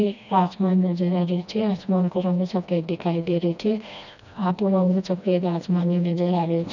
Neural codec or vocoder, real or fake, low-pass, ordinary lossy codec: codec, 16 kHz, 1 kbps, FreqCodec, smaller model; fake; 7.2 kHz; none